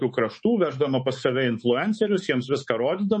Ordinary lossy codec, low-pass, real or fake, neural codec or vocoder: MP3, 32 kbps; 10.8 kHz; fake; codec, 24 kHz, 3.1 kbps, DualCodec